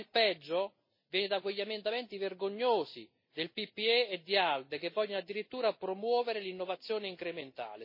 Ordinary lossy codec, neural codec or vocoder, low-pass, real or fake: MP3, 24 kbps; none; 5.4 kHz; real